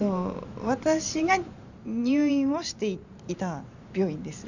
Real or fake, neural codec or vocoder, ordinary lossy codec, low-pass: fake; vocoder, 44.1 kHz, 128 mel bands every 512 samples, BigVGAN v2; none; 7.2 kHz